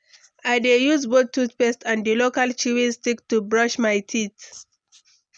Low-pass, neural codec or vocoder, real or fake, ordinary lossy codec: 9.9 kHz; none; real; none